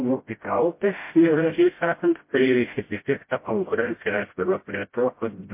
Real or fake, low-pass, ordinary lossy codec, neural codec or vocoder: fake; 3.6 kHz; MP3, 24 kbps; codec, 16 kHz, 0.5 kbps, FreqCodec, smaller model